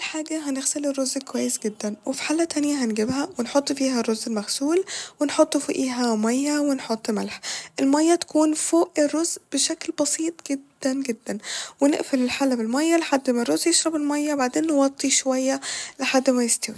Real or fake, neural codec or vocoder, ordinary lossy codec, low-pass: real; none; none; none